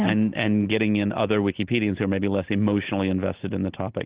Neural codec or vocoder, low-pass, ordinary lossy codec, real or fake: none; 3.6 kHz; Opus, 16 kbps; real